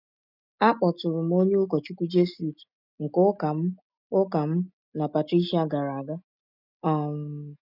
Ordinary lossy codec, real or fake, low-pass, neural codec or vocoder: none; real; 5.4 kHz; none